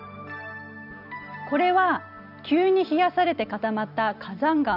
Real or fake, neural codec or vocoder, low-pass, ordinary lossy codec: real; none; 5.4 kHz; none